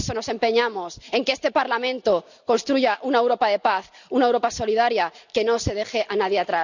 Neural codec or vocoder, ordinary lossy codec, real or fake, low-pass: none; none; real; 7.2 kHz